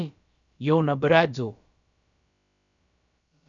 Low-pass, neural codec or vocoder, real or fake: 7.2 kHz; codec, 16 kHz, about 1 kbps, DyCAST, with the encoder's durations; fake